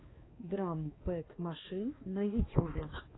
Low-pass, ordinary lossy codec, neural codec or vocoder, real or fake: 7.2 kHz; AAC, 16 kbps; codec, 16 kHz, 2 kbps, X-Codec, HuBERT features, trained on balanced general audio; fake